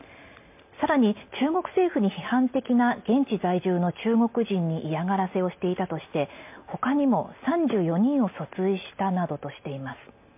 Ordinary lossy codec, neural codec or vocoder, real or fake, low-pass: MP3, 32 kbps; none; real; 3.6 kHz